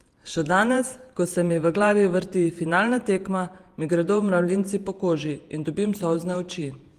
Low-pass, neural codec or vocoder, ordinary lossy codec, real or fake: 14.4 kHz; vocoder, 48 kHz, 128 mel bands, Vocos; Opus, 24 kbps; fake